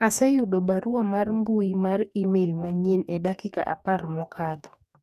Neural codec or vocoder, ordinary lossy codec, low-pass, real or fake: codec, 44.1 kHz, 2.6 kbps, DAC; none; 14.4 kHz; fake